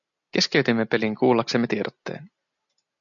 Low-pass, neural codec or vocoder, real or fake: 7.2 kHz; none; real